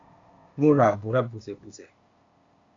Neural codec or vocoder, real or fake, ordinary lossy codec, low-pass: codec, 16 kHz, 0.8 kbps, ZipCodec; fake; AAC, 64 kbps; 7.2 kHz